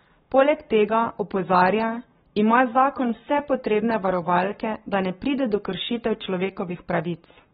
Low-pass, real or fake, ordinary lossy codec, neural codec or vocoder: 19.8 kHz; fake; AAC, 16 kbps; codec, 44.1 kHz, 7.8 kbps, Pupu-Codec